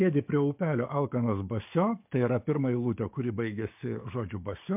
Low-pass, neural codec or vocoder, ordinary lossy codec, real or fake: 3.6 kHz; codec, 44.1 kHz, 7.8 kbps, DAC; MP3, 32 kbps; fake